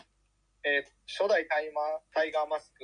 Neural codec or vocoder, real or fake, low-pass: none; real; 9.9 kHz